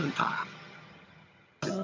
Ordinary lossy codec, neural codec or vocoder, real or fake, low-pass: MP3, 64 kbps; vocoder, 22.05 kHz, 80 mel bands, HiFi-GAN; fake; 7.2 kHz